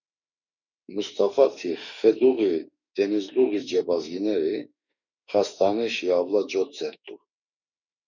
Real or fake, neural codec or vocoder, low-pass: fake; autoencoder, 48 kHz, 32 numbers a frame, DAC-VAE, trained on Japanese speech; 7.2 kHz